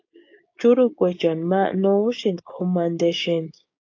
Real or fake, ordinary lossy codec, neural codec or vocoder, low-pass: fake; AAC, 48 kbps; codec, 16 kHz, 6 kbps, DAC; 7.2 kHz